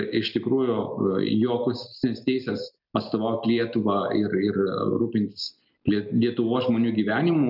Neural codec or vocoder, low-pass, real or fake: none; 5.4 kHz; real